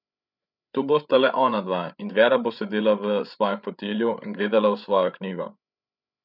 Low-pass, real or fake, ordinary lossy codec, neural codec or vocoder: 5.4 kHz; fake; none; codec, 16 kHz, 8 kbps, FreqCodec, larger model